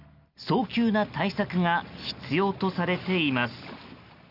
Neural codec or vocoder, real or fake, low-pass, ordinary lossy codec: none; real; 5.4 kHz; none